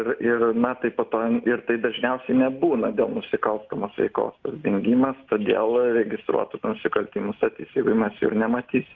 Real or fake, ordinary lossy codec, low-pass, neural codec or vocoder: real; Opus, 32 kbps; 7.2 kHz; none